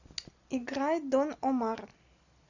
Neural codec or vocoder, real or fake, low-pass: none; real; 7.2 kHz